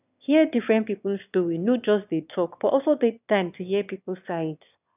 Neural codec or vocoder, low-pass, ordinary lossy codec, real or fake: autoencoder, 22.05 kHz, a latent of 192 numbers a frame, VITS, trained on one speaker; 3.6 kHz; none; fake